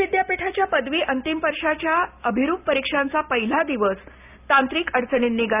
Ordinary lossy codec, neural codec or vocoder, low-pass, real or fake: none; none; 3.6 kHz; real